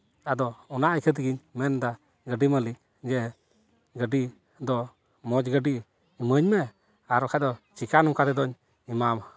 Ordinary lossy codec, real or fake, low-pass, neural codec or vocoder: none; real; none; none